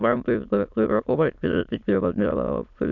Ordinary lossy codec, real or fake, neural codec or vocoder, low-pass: MP3, 64 kbps; fake; autoencoder, 22.05 kHz, a latent of 192 numbers a frame, VITS, trained on many speakers; 7.2 kHz